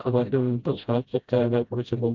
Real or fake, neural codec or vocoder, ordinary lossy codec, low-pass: fake; codec, 16 kHz, 0.5 kbps, FreqCodec, smaller model; Opus, 24 kbps; 7.2 kHz